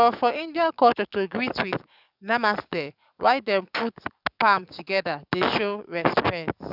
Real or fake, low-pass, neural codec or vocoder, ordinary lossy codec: fake; 5.4 kHz; codec, 44.1 kHz, 7.8 kbps, DAC; none